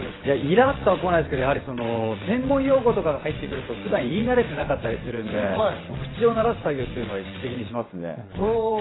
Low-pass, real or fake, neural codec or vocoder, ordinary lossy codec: 7.2 kHz; fake; vocoder, 22.05 kHz, 80 mel bands, WaveNeXt; AAC, 16 kbps